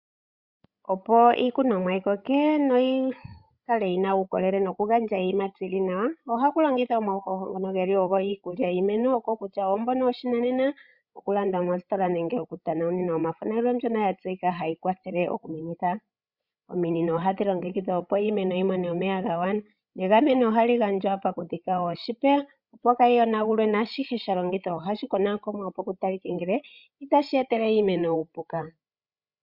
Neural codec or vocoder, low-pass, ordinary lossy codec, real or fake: codec, 16 kHz, 16 kbps, FreqCodec, larger model; 5.4 kHz; Opus, 64 kbps; fake